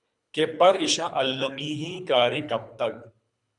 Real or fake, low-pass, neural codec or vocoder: fake; 10.8 kHz; codec, 24 kHz, 3 kbps, HILCodec